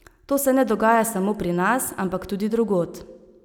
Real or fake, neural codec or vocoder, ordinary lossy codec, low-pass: real; none; none; none